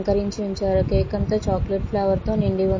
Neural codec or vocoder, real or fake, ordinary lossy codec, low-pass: none; real; MP3, 32 kbps; 7.2 kHz